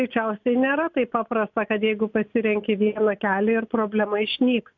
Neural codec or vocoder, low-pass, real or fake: none; 7.2 kHz; real